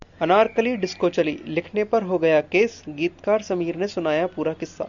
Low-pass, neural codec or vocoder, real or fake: 7.2 kHz; none; real